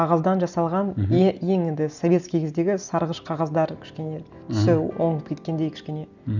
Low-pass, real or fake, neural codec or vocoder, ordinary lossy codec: 7.2 kHz; real; none; none